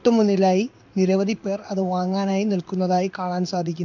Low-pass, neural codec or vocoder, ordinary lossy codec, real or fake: 7.2 kHz; codec, 16 kHz in and 24 kHz out, 1 kbps, XY-Tokenizer; none; fake